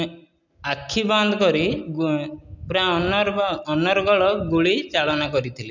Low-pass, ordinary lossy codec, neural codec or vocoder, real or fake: 7.2 kHz; none; none; real